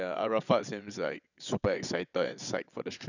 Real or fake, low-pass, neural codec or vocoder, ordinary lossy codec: fake; 7.2 kHz; vocoder, 22.05 kHz, 80 mel bands, WaveNeXt; none